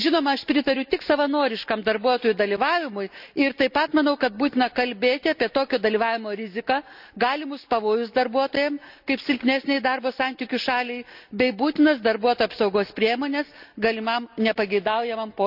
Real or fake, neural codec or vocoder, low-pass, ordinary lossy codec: real; none; 5.4 kHz; none